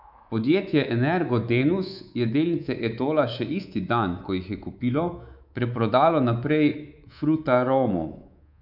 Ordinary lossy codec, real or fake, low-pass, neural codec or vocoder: none; fake; 5.4 kHz; codec, 24 kHz, 3.1 kbps, DualCodec